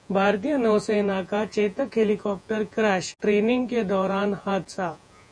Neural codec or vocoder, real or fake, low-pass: vocoder, 48 kHz, 128 mel bands, Vocos; fake; 9.9 kHz